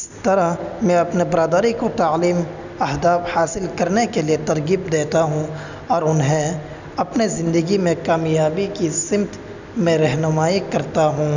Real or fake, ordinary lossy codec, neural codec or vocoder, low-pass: real; none; none; 7.2 kHz